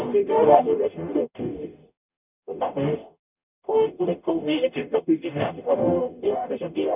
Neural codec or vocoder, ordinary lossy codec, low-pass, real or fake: codec, 44.1 kHz, 0.9 kbps, DAC; none; 3.6 kHz; fake